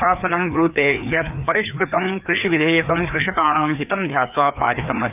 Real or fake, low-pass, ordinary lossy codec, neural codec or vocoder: fake; 3.6 kHz; none; codec, 16 kHz, 2 kbps, FreqCodec, larger model